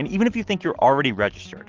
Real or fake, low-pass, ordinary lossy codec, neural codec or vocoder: real; 7.2 kHz; Opus, 16 kbps; none